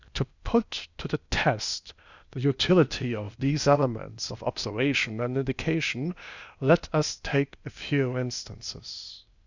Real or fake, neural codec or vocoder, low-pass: fake; codec, 16 kHz, 0.8 kbps, ZipCodec; 7.2 kHz